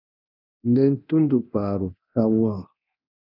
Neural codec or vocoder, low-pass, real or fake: codec, 24 kHz, 0.9 kbps, DualCodec; 5.4 kHz; fake